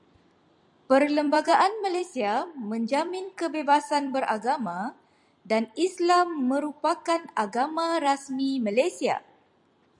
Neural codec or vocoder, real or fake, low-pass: vocoder, 44.1 kHz, 128 mel bands every 256 samples, BigVGAN v2; fake; 10.8 kHz